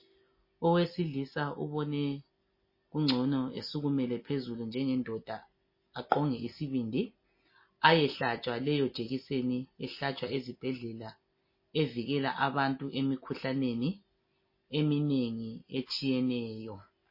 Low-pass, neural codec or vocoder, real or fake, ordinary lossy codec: 5.4 kHz; none; real; MP3, 24 kbps